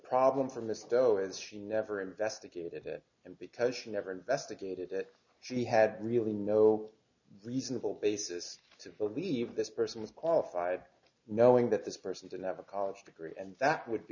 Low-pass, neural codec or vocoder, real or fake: 7.2 kHz; none; real